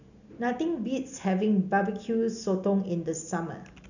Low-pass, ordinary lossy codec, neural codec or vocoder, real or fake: 7.2 kHz; none; none; real